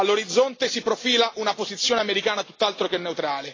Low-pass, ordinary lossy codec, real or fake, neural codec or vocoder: 7.2 kHz; AAC, 32 kbps; real; none